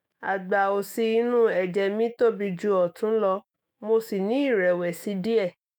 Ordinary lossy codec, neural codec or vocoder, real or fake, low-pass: none; autoencoder, 48 kHz, 128 numbers a frame, DAC-VAE, trained on Japanese speech; fake; none